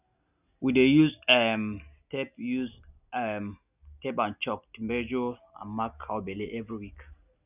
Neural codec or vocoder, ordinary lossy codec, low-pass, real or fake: none; none; 3.6 kHz; real